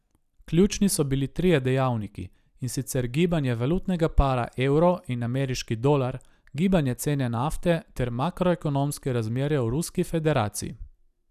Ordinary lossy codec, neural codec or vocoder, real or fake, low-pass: none; none; real; 14.4 kHz